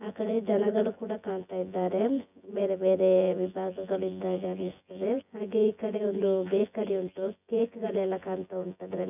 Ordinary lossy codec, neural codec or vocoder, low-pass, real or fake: none; vocoder, 24 kHz, 100 mel bands, Vocos; 3.6 kHz; fake